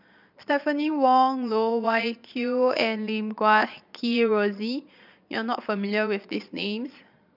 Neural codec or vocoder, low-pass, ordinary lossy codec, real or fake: vocoder, 22.05 kHz, 80 mel bands, Vocos; 5.4 kHz; none; fake